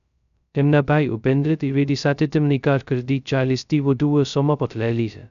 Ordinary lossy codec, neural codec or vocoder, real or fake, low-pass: none; codec, 16 kHz, 0.2 kbps, FocalCodec; fake; 7.2 kHz